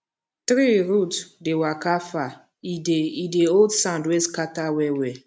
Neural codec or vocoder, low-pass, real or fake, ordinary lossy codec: none; none; real; none